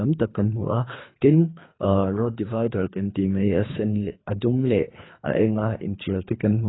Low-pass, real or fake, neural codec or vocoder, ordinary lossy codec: 7.2 kHz; fake; codec, 24 kHz, 3 kbps, HILCodec; AAC, 16 kbps